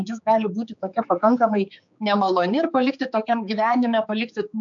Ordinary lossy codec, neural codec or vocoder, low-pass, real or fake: AAC, 64 kbps; codec, 16 kHz, 4 kbps, X-Codec, HuBERT features, trained on general audio; 7.2 kHz; fake